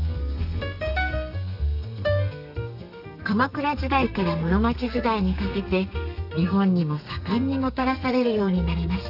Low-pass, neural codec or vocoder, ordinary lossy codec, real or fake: 5.4 kHz; codec, 32 kHz, 1.9 kbps, SNAC; none; fake